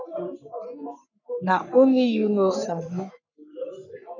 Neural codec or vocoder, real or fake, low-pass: codec, 44.1 kHz, 3.4 kbps, Pupu-Codec; fake; 7.2 kHz